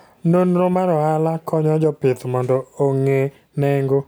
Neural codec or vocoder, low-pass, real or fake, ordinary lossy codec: none; none; real; none